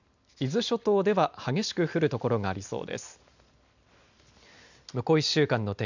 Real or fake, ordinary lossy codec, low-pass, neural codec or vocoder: real; none; 7.2 kHz; none